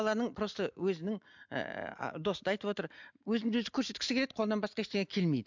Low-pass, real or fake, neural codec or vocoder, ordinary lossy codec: 7.2 kHz; real; none; MP3, 64 kbps